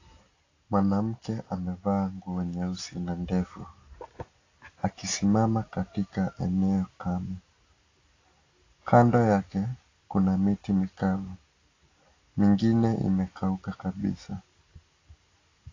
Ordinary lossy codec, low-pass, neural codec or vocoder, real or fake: AAC, 32 kbps; 7.2 kHz; none; real